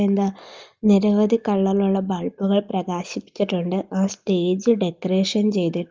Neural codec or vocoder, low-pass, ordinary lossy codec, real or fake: none; 7.2 kHz; Opus, 32 kbps; real